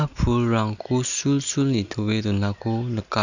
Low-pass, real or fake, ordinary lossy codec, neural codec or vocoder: 7.2 kHz; real; none; none